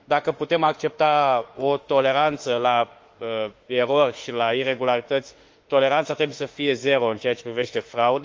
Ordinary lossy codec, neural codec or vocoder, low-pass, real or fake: Opus, 32 kbps; autoencoder, 48 kHz, 32 numbers a frame, DAC-VAE, trained on Japanese speech; 7.2 kHz; fake